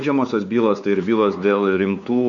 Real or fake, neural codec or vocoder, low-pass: fake; codec, 16 kHz, 4 kbps, X-Codec, WavLM features, trained on Multilingual LibriSpeech; 7.2 kHz